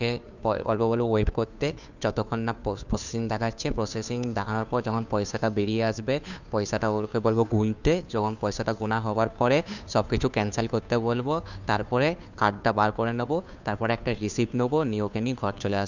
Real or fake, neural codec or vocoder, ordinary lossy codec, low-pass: fake; codec, 16 kHz, 2 kbps, FunCodec, trained on Chinese and English, 25 frames a second; none; 7.2 kHz